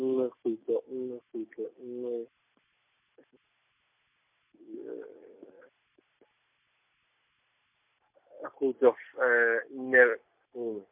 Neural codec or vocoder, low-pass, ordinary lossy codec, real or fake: none; 3.6 kHz; MP3, 32 kbps; real